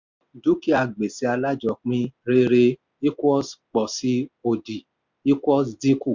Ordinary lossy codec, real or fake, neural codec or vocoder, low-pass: MP3, 64 kbps; real; none; 7.2 kHz